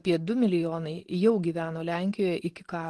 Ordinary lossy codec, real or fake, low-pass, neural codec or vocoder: Opus, 16 kbps; real; 10.8 kHz; none